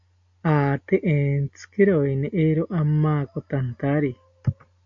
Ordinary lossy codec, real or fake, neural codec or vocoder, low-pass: AAC, 64 kbps; real; none; 7.2 kHz